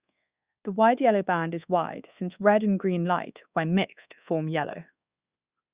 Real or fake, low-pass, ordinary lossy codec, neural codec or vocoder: fake; 3.6 kHz; Opus, 64 kbps; codec, 24 kHz, 1.2 kbps, DualCodec